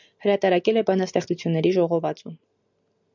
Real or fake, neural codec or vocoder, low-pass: real; none; 7.2 kHz